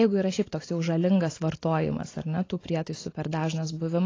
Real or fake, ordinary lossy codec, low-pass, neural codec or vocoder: real; AAC, 32 kbps; 7.2 kHz; none